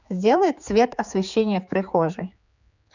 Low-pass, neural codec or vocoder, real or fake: 7.2 kHz; codec, 16 kHz, 4 kbps, X-Codec, HuBERT features, trained on general audio; fake